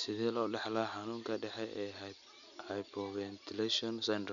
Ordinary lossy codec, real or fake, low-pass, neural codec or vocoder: none; real; 7.2 kHz; none